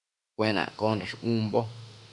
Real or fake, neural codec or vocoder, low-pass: fake; autoencoder, 48 kHz, 32 numbers a frame, DAC-VAE, trained on Japanese speech; 10.8 kHz